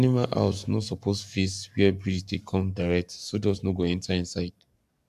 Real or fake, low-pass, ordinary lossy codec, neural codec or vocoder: fake; 14.4 kHz; none; codec, 44.1 kHz, 7.8 kbps, Pupu-Codec